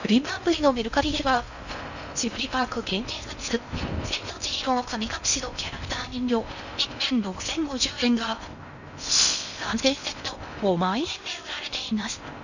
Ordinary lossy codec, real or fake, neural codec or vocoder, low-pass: none; fake; codec, 16 kHz in and 24 kHz out, 0.6 kbps, FocalCodec, streaming, 4096 codes; 7.2 kHz